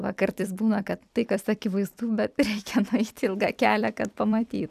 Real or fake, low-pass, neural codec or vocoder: fake; 14.4 kHz; vocoder, 44.1 kHz, 128 mel bands every 256 samples, BigVGAN v2